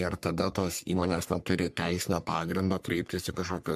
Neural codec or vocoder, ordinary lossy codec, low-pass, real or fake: codec, 44.1 kHz, 3.4 kbps, Pupu-Codec; MP3, 96 kbps; 14.4 kHz; fake